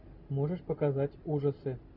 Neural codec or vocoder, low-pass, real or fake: none; 5.4 kHz; real